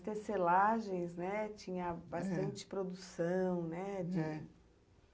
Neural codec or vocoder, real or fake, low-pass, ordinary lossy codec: none; real; none; none